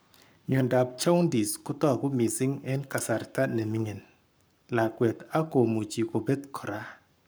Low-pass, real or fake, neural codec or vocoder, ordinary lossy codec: none; fake; codec, 44.1 kHz, 7.8 kbps, Pupu-Codec; none